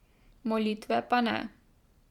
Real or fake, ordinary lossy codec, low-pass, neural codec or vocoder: fake; Opus, 64 kbps; 19.8 kHz; vocoder, 44.1 kHz, 128 mel bands every 512 samples, BigVGAN v2